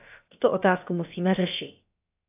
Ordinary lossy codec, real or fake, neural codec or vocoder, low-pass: AAC, 32 kbps; fake; codec, 16 kHz, about 1 kbps, DyCAST, with the encoder's durations; 3.6 kHz